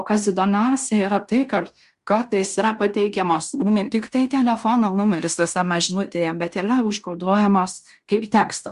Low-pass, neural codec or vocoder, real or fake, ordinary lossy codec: 10.8 kHz; codec, 16 kHz in and 24 kHz out, 0.9 kbps, LongCat-Audio-Codec, fine tuned four codebook decoder; fake; Opus, 64 kbps